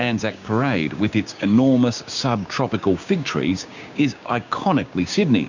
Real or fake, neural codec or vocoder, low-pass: fake; codec, 16 kHz, 6 kbps, DAC; 7.2 kHz